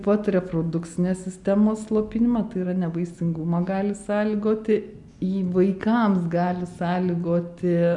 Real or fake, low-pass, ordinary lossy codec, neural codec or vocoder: real; 10.8 kHz; MP3, 96 kbps; none